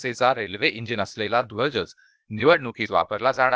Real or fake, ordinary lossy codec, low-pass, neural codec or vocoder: fake; none; none; codec, 16 kHz, 0.8 kbps, ZipCodec